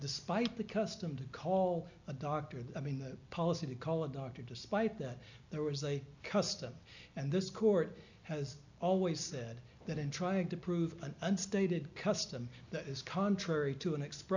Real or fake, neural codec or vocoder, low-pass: real; none; 7.2 kHz